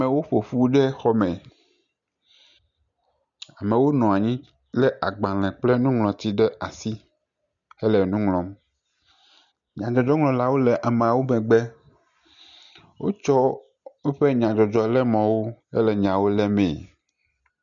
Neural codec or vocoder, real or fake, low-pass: none; real; 7.2 kHz